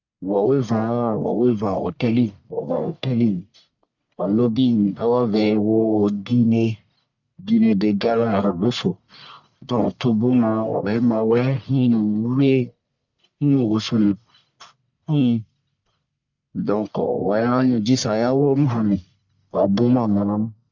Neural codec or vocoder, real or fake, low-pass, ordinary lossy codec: codec, 44.1 kHz, 1.7 kbps, Pupu-Codec; fake; 7.2 kHz; none